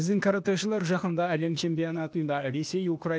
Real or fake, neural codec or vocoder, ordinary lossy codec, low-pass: fake; codec, 16 kHz, 0.8 kbps, ZipCodec; none; none